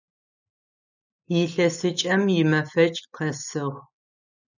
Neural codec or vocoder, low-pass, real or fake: none; 7.2 kHz; real